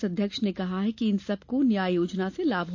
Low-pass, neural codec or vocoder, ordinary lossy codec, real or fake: 7.2 kHz; none; AAC, 48 kbps; real